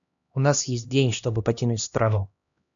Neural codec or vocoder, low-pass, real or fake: codec, 16 kHz, 1 kbps, X-Codec, HuBERT features, trained on LibriSpeech; 7.2 kHz; fake